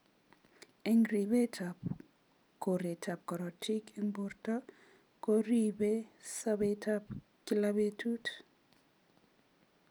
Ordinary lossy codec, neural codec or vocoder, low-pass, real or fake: none; none; none; real